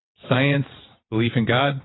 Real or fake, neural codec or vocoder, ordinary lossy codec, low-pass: fake; vocoder, 22.05 kHz, 80 mel bands, WaveNeXt; AAC, 16 kbps; 7.2 kHz